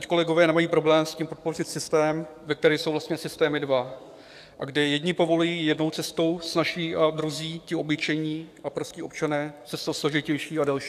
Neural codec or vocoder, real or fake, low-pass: codec, 44.1 kHz, 7.8 kbps, DAC; fake; 14.4 kHz